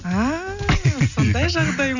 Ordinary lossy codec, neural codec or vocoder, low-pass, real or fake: none; none; 7.2 kHz; real